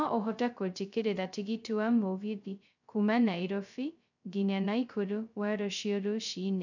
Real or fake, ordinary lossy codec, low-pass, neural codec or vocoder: fake; none; 7.2 kHz; codec, 16 kHz, 0.2 kbps, FocalCodec